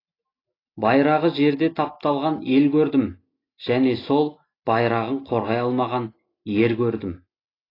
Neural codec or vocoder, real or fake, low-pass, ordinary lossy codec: none; real; 5.4 kHz; AAC, 24 kbps